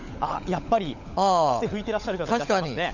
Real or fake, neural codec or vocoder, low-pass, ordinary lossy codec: fake; codec, 16 kHz, 16 kbps, FunCodec, trained on LibriTTS, 50 frames a second; 7.2 kHz; none